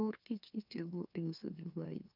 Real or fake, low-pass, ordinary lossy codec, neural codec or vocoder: fake; 5.4 kHz; none; autoencoder, 44.1 kHz, a latent of 192 numbers a frame, MeloTTS